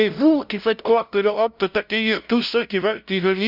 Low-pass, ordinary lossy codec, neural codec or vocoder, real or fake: 5.4 kHz; none; codec, 16 kHz, 0.5 kbps, FunCodec, trained on LibriTTS, 25 frames a second; fake